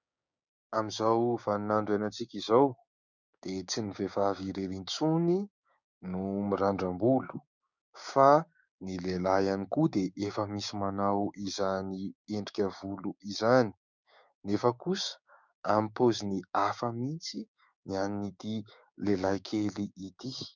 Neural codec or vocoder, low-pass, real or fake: codec, 16 kHz, 6 kbps, DAC; 7.2 kHz; fake